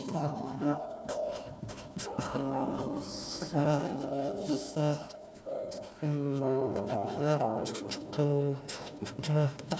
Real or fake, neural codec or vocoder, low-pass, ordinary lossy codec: fake; codec, 16 kHz, 1 kbps, FunCodec, trained on Chinese and English, 50 frames a second; none; none